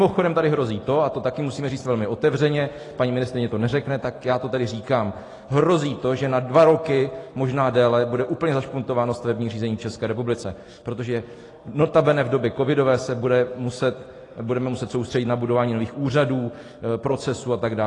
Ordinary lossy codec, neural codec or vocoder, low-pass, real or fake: AAC, 32 kbps; none; 10.8 kHz; real